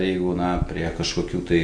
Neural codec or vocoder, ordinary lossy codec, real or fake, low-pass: none; Opus, 64 kbps; real; 9.9 kHz